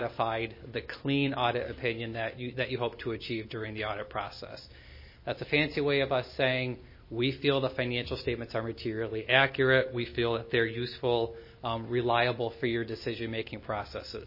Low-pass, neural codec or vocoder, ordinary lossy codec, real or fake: 5.4 kHz; none; MP3, 24 kbps; real